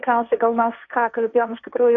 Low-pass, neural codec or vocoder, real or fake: 7.2 kHz; codec, 16 kHz, 1.1 kbps, Voila-Tokenizer; fake